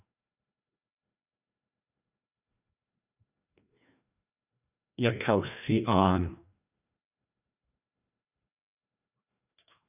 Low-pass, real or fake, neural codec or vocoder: 3.6 kHz; fake; codec, 16 kHz, 1 kbps, FreqCodec, larger model